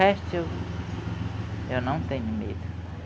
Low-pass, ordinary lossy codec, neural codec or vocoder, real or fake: none; none; none; real